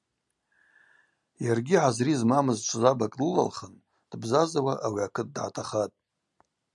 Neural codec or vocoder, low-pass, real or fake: none; 10.8 kHz; real